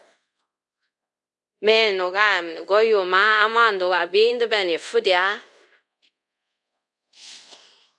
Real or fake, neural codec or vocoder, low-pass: fake; codec, 24 kHz, 0.5 kbps, DualCodec; 10.8 kHz